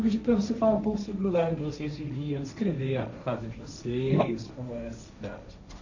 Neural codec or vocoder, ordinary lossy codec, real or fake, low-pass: codec, 16 kHz, 1.1 kbps, Voila-Tokenizer; none; fake; 7.2 kHz